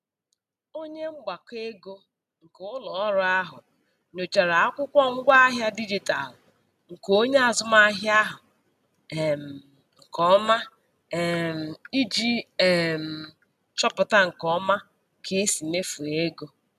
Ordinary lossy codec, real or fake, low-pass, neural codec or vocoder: none; real; 14.4 kHz; none